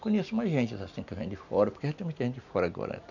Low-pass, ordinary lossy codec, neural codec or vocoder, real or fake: 7.2 kHz; none; none; real